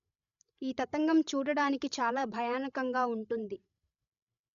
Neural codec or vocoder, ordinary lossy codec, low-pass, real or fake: codec, 16 kHz, 16 kbps, FreqCodec, larger model; none; 7.2 kHz; fake